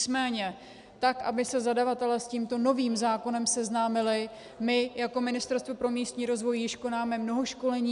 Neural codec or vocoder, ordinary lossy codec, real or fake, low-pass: none; AAC, 96 kbps; real; 10.8 kHz